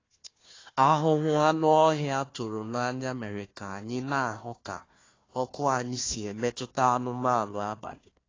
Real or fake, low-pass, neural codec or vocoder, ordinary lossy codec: fake; 7.2 kHz; codec, 16 kHz, 1 kbps, FunCodec, trained on Chinese and English, 50 frames a second; AAC, 32 kbps